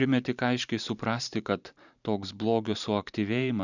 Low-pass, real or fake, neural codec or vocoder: 7.2 kHz; fake; vocoder, 44.1 kHz, 128 mel bands every 512 samples, BigVGAN v2